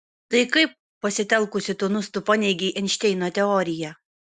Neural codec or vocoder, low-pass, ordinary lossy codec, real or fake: none; 10.8 kHz; Opus, 64 kbps; real